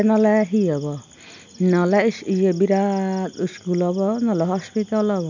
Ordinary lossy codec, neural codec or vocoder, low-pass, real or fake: none; none; 7.2 kHz; real